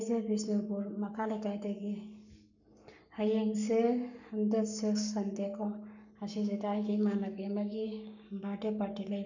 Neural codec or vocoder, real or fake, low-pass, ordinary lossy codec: codec, 44.1 kHz, 7.8 kbps, Pupu-Codec; fake; 7.2 kHz; none